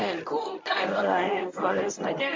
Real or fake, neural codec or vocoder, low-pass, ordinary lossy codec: fake; codec, 24 kHz, 0.9 kbps, WavTokenizer, medium speech release version 1; 7.2 kHz; MP3, 64 kbps